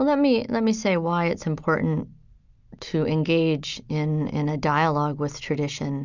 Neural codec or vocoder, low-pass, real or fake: none; 7.2 kHz; real